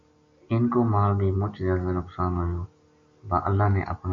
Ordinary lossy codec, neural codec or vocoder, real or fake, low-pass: AAC, 48 kbps; none; real; 7.2 kHz